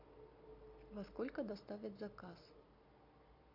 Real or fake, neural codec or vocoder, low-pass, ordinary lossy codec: real; none; 5.4 kHz; AAC, 32 kbps